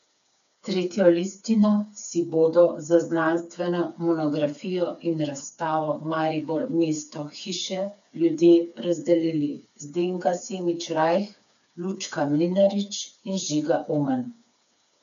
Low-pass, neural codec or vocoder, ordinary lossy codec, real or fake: 7.2 kHz; codec, 16 kHz, 4 kbps, FreqCodec, smaller model; none; fake